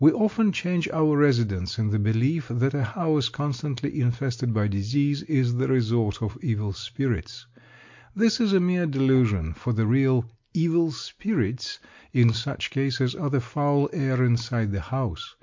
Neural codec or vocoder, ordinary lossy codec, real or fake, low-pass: none; MP3, 48 kbps; real; 7.2 kHz